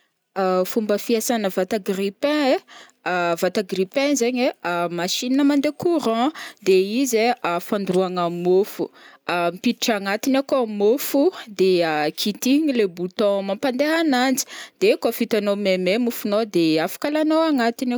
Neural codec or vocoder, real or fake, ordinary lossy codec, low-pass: none; real; none; none